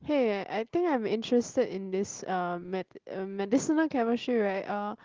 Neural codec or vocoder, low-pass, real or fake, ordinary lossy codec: none; 7.2 kHz; real; Opus, 16 kbps